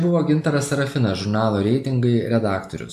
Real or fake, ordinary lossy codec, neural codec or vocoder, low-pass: real; AAC, 96 kbps; none; 14.4 kHz